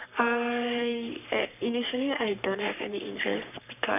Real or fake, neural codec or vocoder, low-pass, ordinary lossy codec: fake; codec, 44.1 kHz, 3.4 kbps, Pupu-Codec; 3.6 kHz; none